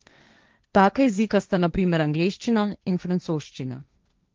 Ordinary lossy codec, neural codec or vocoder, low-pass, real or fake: Opus, 32 kbps; codec, 16 kHz, 1.1 kbps, Voila-Tokenizer; 7.2 kHz; fake